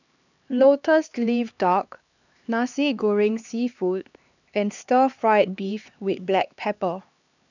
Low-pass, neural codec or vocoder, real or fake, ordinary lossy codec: 7.2 kHz; codec, 16 kHz, 2 kbps, X-Codec, HuBERT features, trained on LibriSpeech; fake; none